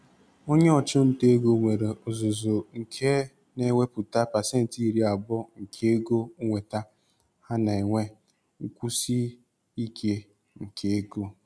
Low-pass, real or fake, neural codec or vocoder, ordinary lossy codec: none; real; none; none